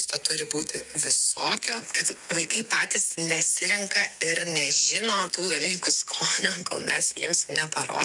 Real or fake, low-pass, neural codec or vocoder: fake; 10.8 kHz; codec, 32 kHz, 1.9 kbps, SNAC